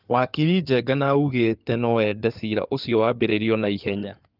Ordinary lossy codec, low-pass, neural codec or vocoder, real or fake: Opus, 24 kbps; 5.4 kHz; codec, 16 kHz in and 24 kHz out, 2.2 kbps, FireRedTTS-2 codec; fake